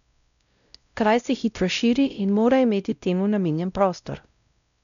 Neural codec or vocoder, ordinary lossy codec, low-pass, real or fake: codec, 16 kHz, 0.5 kbps, X-Codec, WavLM features, trained on Multilingual LibriSpeech; none; 7.2 kHz; fake